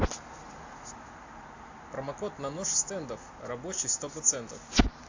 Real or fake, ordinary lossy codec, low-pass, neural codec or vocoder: real; AAC, 48 kbps; 7.2 kHz; none